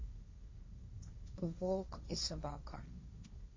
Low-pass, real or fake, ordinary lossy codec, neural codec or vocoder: 7.2 kHz; fake; MP3, 32 kbps; codec, 16 kHz, 1.1 kbps, Voila-Tokenizer